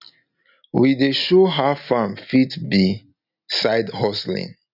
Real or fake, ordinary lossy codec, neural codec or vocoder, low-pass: real; none; none; 5.4 kHz